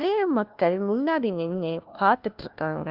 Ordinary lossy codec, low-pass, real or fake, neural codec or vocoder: Opus, 24 kbps; 5.4 kHz; fake; codec, 16 kHz, 1 kbps, FunCodec, trained on LibriTTS, 50 frames a second